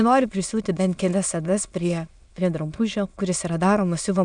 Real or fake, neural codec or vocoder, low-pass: fake; autoencoder, 22.05 kHz, a latent of 192 numbers a frame, VITS, trained on many speakers; 9.9 kHz